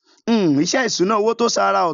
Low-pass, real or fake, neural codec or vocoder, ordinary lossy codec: 7.2 kHz; real; none; none